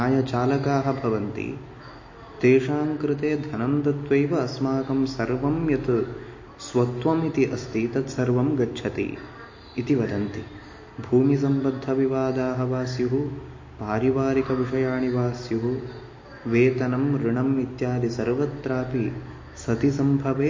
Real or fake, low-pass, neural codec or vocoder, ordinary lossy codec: real; 7.2 kHz; none; MP3, 32 kbps